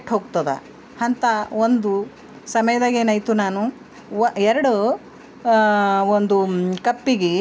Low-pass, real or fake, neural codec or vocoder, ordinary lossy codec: none; real; none; none